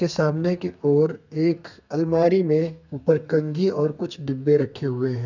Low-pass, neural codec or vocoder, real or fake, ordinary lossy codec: 7.2 kHz; codec, 32 kHz, 1.9 kbps, SNAC; fake; none